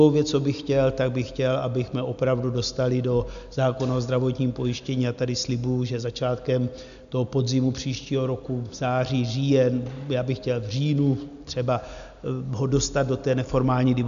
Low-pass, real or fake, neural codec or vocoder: 7.2 kHz; real; none